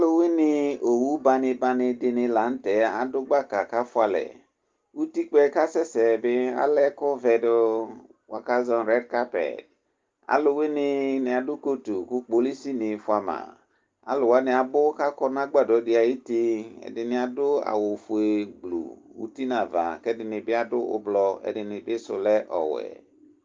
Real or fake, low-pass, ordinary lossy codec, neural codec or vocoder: real; 7.2 kHz; Opus, 32 kbps; none